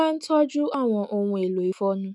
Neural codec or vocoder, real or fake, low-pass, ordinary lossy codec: autoencoder, 48 kHz, 128 numbers a frame, DAC-VAE, trained on Japanese speech; fake; 10.8 kHz; none